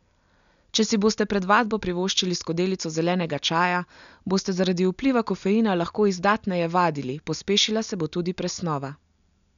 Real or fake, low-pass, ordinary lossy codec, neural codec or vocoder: real; 7.2 kHz; none; none